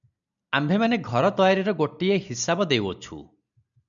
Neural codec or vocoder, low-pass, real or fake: none; 7.2 kHz; real